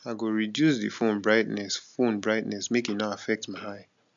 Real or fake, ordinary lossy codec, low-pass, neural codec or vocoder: real; MP3, 64 kbps; 7.2 kHz; none